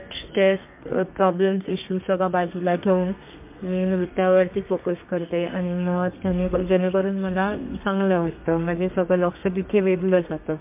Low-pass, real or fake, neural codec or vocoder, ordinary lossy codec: 3.6 kHz; fake; codec, 32 kHz, 1.9 kbps, SNAC; MP3, 32 kbps